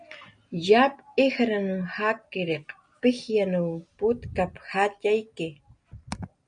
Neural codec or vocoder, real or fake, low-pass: none; real; 9.9 kHz